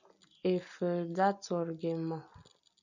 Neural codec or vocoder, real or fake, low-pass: none; real; 7.2 kHz